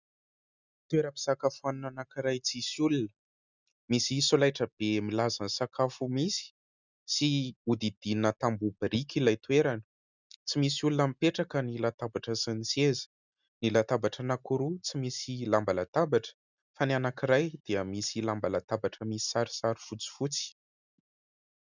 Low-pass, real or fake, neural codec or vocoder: 7.2 kHz; real; none